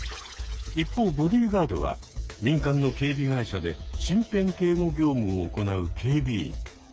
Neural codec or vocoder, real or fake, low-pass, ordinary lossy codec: codec, 16 kHz, 4 kbps, FreqCodec, smaller model; fake; none; none